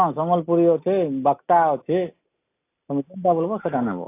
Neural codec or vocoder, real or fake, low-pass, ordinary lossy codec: none; real; 3.6 kHz; AAC, 24 kbps